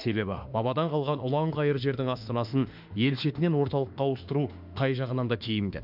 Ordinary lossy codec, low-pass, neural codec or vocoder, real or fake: none; 5.4 kHz; autoencoder, 48 kHz, 32 numbers a frame, DAC-VAE, trained on Japanese speech; fake